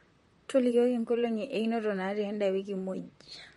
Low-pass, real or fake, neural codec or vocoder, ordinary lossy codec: 19.8 kHz; fake; vocoder, 44.1 kHz, 128 mel bands, Pupu-Vocoder; MP3, 48 kbps